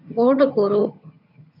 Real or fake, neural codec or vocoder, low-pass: fake; vocoder, 22.05 kHz, 80 mel bands, HiFi-GAN; 5.4 kHz